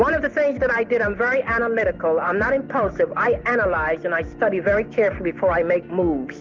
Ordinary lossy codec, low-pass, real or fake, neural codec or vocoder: Opus, 32 kbps; 7.2 kHz; real; none